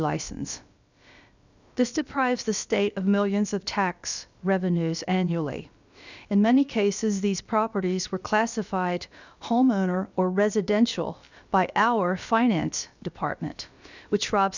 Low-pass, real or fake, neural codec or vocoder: 7.2 kHz; fake; codec, 16 kHz, about 1 kbps, DyCAST, with the encoder's durations